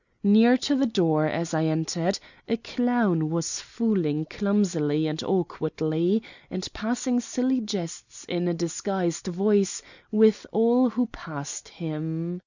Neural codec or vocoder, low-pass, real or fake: none; 7.2 kHz; real